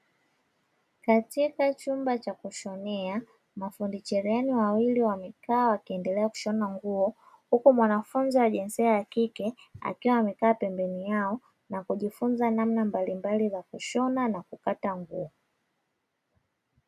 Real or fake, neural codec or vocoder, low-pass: real; none; 14.4 kHz